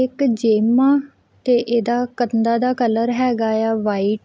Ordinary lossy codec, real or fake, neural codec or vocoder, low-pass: none; real; none; none